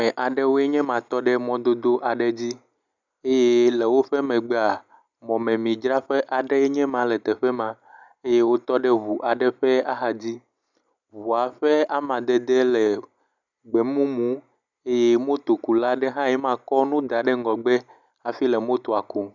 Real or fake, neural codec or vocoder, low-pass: real; none; 7.2 kHz